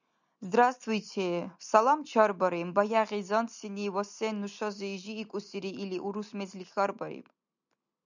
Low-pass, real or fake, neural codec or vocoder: 7.2 kHz; real; none